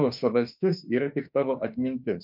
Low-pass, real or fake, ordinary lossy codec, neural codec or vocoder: 5.4 kHz; fake; MP3, 48 kbps; autoencoder, 48 kHz, 32 numbers a frame, DAC-VAE, trained on Japanese speech